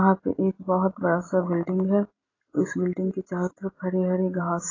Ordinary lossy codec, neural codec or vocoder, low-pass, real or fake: AAC, 32 kbps; none; 7.2 kHz; real